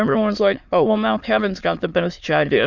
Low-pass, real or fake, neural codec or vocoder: 7.2 kHz; fake; autoencoder, 22.05 kHz, a latent of 192 numbers a frame, VITS, trained on many speakers